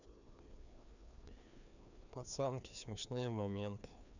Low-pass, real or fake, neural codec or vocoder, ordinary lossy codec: 7.2 kHz; fake; codec, 16 kHz, 2 kbps, FreqCodec, larger model; none